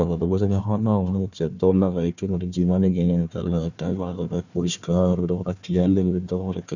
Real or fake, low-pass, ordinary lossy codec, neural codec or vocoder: fake; 7.2 kHz; none; codec, 16 kHz, 1 kbps, FunCodec, trained on Chinese and English, 50 frames a second